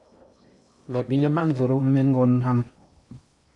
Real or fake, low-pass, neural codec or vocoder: fake; 10.8 kHz; codec, 16 kHz in and 24 kHz out, 0.8 kbps, FocalCodec, streaming, 65536 codes